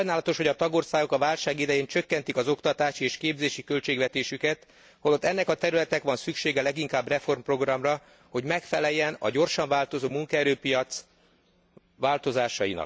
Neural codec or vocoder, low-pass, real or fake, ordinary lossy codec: none; none; real; none